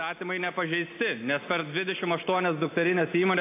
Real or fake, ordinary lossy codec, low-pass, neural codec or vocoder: real; AAC, 24 kbps; 3.6 kHz; none